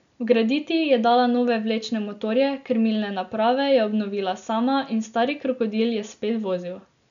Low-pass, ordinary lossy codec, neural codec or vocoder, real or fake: 7.2 kHz; none; none; real